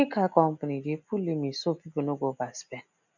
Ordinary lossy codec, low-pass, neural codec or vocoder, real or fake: none; 7.2 kHz; none; real